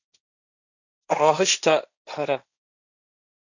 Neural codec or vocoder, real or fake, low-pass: codec, 16 kHz, 1.1 kbps, Voila-Tokenizer; fake; 7.2 kHz